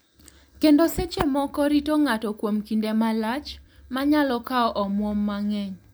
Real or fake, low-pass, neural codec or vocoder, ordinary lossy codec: real; none; none; none